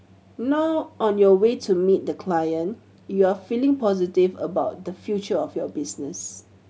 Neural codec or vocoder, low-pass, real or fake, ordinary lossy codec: none; none; real; none